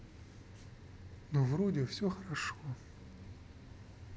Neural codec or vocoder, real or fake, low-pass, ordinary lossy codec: none; real; none; none